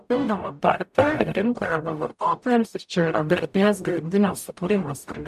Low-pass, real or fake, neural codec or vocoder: 14.4 kHz; fake; codec, 44.1 kHz, 0.9 kbps, DAC